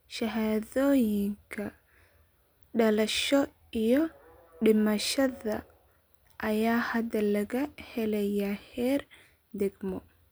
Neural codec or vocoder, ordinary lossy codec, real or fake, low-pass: none; none; real; none